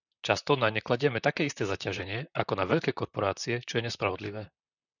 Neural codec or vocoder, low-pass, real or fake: vocoder, 44.1 kHz, 128 mel bands, Pupu-Vocoder; 7.2 kHz; fake